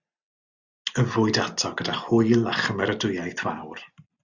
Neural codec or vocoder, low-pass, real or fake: none; 7.2 kHz; real